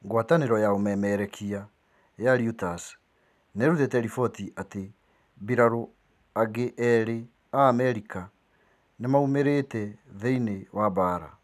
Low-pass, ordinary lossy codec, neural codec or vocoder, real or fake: 14.4 kHz; none; none; real